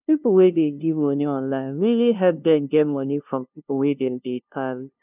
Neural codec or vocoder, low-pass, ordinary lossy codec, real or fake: codec, 16 kHz, 0.5 kbps, FunCodec, trained on LibriTTS, 25 frames a second; 3.6 kHz; none; fake